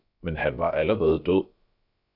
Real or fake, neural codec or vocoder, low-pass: fake; codec, 16 kHz, about 1 kbps, DyCAST, with the encoder's durations; 5.4 kHz